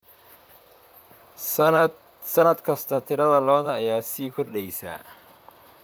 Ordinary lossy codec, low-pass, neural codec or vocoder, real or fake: none; none; vocoder, 44.1 kHz, 128 mel bands, Pupu-Vocoder; fake